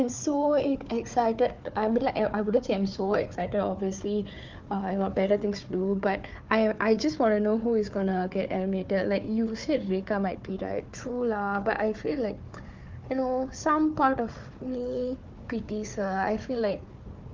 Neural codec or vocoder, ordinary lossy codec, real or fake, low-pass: codec, 16 kHz, 4 kbps, FunCodec, trained on Chinese and English, 50 frames a second; Opus, 24 kbps; fake; 7.2 kHz